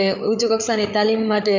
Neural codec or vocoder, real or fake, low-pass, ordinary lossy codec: codec, 16 kHz, 8 kbps, FreqCodec, larger model; fake; 7.2 kHz; none